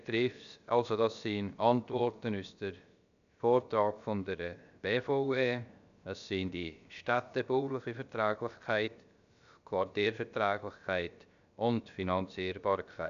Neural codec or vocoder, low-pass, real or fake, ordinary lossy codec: codec, 16 kHz, about 1 kbps, DyCAST, with the encoder's durations; 7.2 kHz; fake; none